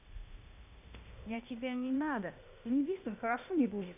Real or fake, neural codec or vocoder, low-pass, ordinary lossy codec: fake; codec, 16 kHz, 0.8 kbps, ZipCodec; 3.6 kHz; none